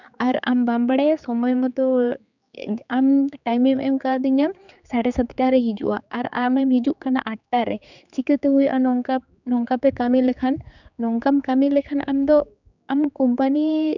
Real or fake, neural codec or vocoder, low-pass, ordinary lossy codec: fake; codec, 16 kHz, 4 kbps, X-Codec, HuBERT features, trained on general audio; 7.2 kHz; none